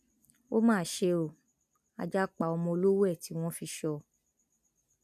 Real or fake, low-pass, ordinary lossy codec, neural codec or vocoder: real; 14.4 kHz; none; none